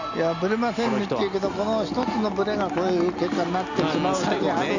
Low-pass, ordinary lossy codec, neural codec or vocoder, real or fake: 7.2 kHz; none; none; real